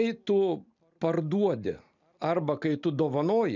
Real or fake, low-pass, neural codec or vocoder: real; 7.2 kHz; none